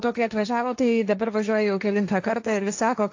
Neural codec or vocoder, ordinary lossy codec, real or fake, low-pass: codec, 16 kHz, 1.1 kbps, Voila-Tokenizer; AAC, 48 kbps; fake; 7.2 kHz